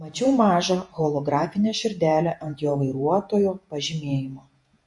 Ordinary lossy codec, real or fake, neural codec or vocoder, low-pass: MP3, 48 kbps; fake; vocoder, 48 kHz, 128 mel bands, Vocos; 10.8 kHz